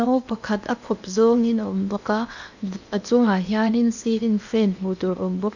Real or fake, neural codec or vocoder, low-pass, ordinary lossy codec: fake; codec, 16 kHz in and 24 kHz out, 0.8 kbps, FocalCodec, streaming, 65536 codes; 7.2 kHz; none